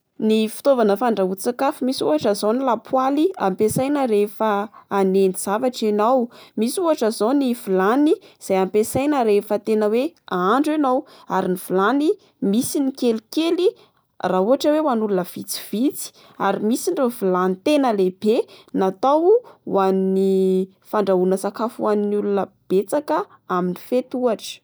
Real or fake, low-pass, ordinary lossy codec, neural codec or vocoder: real; none; none; none